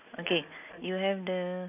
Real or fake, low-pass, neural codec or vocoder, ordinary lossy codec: real; 3.6 kHz; none; none